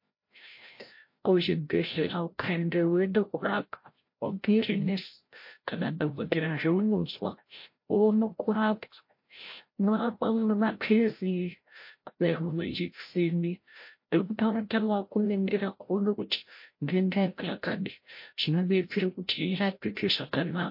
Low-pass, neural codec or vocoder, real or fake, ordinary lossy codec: 5.4 kHz; codec, 16 kHz, 0.5 kbps, FreqCodec, larger model; fake; MP3, 32 kbps